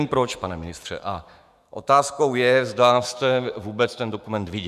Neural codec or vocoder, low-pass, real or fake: autoencoder, 48 kHz, 128 numbers a frame, DAC-VAE, trained on Japanese speech; 14.4 kHz; fake